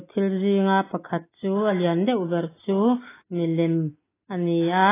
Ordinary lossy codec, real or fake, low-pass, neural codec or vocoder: AAC, 16 kbps; real; 3.6 kHz; none